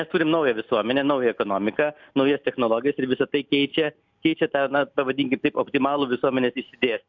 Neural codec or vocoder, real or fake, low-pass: none; real; 7.2 kHz